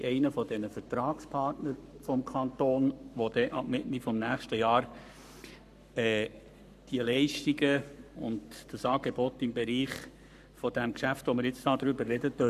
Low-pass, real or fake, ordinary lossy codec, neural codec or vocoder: 14.4 kHz; fake; none; codec, 44.1 kHz, 7.8 kbps, Pupu-Codec